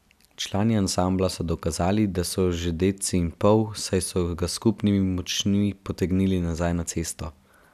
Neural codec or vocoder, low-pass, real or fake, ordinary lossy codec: none; 14.4 kHz; real; none